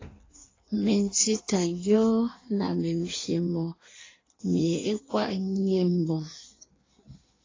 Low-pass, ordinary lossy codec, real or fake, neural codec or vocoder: 7.2 kHz; AAC, 32 kbps; fake; codec, 16 kHz in and 24 kHz out, 1.1 kbps, FireRedTTS-2 codec